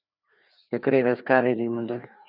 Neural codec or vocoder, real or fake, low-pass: codec, 16 kHz, 2 kbps, FreqCodec, larger model; fake; 5.4 kHz